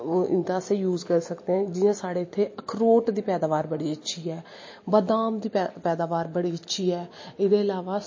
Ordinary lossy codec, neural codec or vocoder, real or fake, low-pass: MP3, 32 kbps; none; real; 7.2 kHz